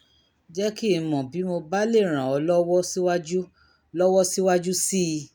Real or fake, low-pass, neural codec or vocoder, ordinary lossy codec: real; none; none; none